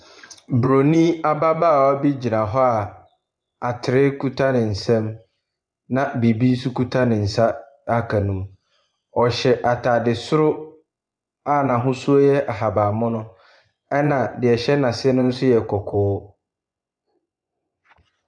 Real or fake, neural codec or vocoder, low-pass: fake; vocoder, 24 kHz, 100 mel bands, Vocos; 9.9 kHz